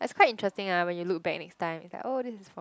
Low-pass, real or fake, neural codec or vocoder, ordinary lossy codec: none; real; none; none